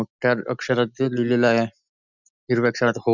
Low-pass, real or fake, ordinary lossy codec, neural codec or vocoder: 7.2 kHz; real; none; none